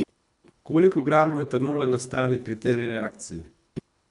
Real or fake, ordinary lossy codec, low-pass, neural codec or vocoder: fake; none; 10.8 kHz; codec, 24 kHz, 1.5 kbps, HILCodec